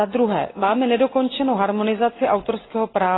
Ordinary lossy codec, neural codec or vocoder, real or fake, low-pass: AAC, 16 kbps; none; real; 7.2 kHz